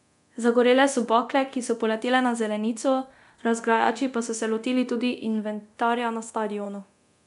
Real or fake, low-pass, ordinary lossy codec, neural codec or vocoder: fake; 10.8 kHz; none; codec, 24 kHz, 0.9 kbps, DualCodec